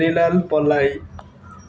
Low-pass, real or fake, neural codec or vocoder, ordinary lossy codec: none; real; none; none